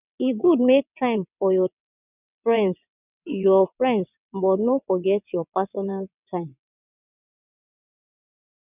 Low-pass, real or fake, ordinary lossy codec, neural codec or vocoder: 3.6 kHz; fake; none; vocoder, 22.05 kHz, 80 mel bands, WaveNeXt